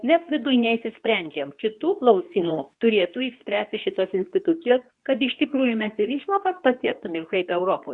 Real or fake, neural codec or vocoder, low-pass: fake; codec, 24 kHz, 0.9 kbps, WavTokenizer, medium speech release version 2; 10.8 kHz